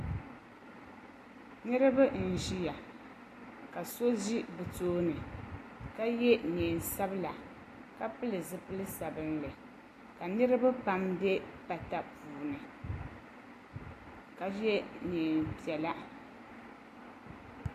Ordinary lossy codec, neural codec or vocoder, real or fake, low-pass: AAC, 64 kbps; none; real; 14.4 kHz